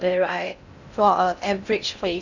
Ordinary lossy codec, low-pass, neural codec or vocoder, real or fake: none; 7.2 kHz; codec, 16 kHz in and 24 kHz out, 0.6 kbps, FocalCodec, streaming, 2048 codes; fake